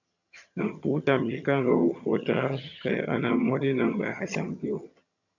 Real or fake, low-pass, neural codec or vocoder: fake; 7.2 kHz; vocoder, 22.05 kHz, 80 mel bands, HiFi-GAN